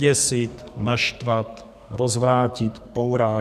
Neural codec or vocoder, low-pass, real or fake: codec, 44.1 kHz, 2.6 kbps, SNAC; 14.4 kHz; fake